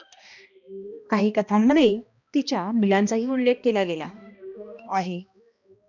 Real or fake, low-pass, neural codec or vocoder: fake; 7.2 kHz; codec, 16 kHz, 1 kbps, X-Codec, HuBERT features, trained on balanced general audio